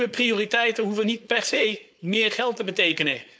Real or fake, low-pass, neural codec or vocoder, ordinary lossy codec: fake; none; codec, 16 kHz, 4.8 kbps, FACodec; none